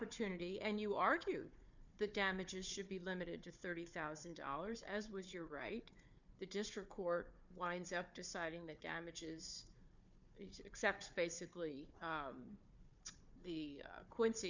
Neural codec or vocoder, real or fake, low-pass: codec, 16 kHz, 4 kbps, FunCodec, trained on Chinese and English, 50 frames a second; fake; 7.2 kHz